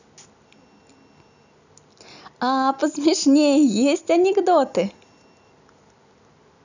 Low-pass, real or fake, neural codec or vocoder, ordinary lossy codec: 7.2 kHz; real; none; none